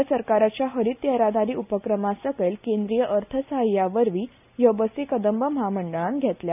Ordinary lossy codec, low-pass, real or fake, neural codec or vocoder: none; 3.6 kHz; real; none